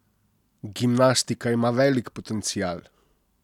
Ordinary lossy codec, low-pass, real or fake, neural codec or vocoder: none; 19.8 kHz; real; none